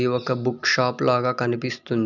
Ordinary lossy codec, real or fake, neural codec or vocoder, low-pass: none; real; none; 7.2 kHz